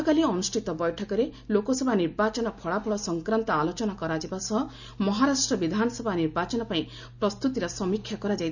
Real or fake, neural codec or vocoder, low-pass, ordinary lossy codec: real; none; 7.2 kHz; none